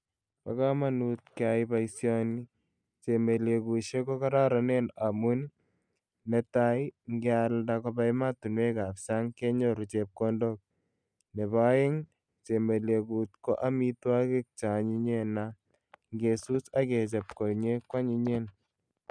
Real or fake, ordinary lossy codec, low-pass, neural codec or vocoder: real; none; 9.9 kHz; none